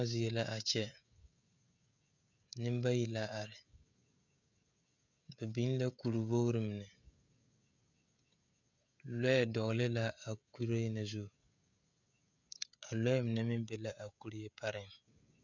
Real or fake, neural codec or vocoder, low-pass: fake; codec, 16 kHz, 16 kbps, FreqCodec, smaller model; 7.2 kHz